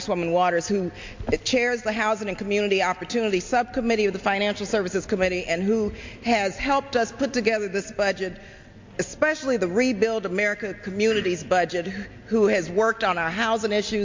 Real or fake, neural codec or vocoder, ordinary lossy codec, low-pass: real; none; MP3, 48 kbps; 7.2 kHz